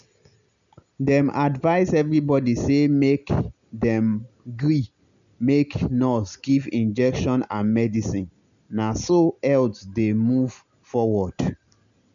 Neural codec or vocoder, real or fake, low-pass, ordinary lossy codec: none; real; 7.2 kHz; none